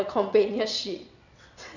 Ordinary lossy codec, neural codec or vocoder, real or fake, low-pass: none; vocoder, 22.05 kHz, 80 mel bands, WaveNeXt; fake; 7.2 kHz